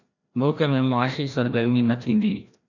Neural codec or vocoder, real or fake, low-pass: codec, 16 kHz, 1 kbps, FreqCodec, larger model; fake; 7.2 kHz